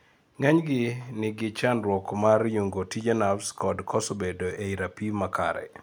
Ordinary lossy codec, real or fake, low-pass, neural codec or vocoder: none; real; none; none